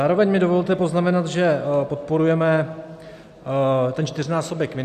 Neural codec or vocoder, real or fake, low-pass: none; real; 14.4 kHz